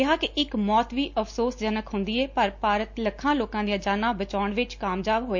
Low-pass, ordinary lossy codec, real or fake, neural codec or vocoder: 7.2 kHz; MP3, 48 kbps; real; none